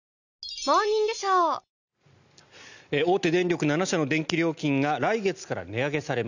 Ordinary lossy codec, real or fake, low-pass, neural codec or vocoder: none; real; 7.2 kHz; none